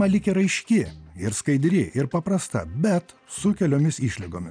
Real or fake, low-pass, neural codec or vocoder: real; 9.9 kHz; none